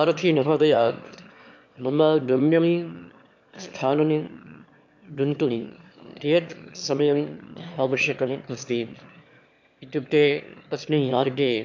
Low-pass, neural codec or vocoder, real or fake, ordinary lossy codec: 7.2 kHz; autoencoder, 22.05 kHz, a latent of 192 numbers a frame, VITS, trained on one speaker; fake; MP3, 64 kbps